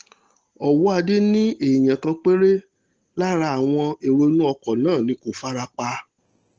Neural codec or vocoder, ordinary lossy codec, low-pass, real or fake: none; Opus, 16 kbps; 7.2 kHz; real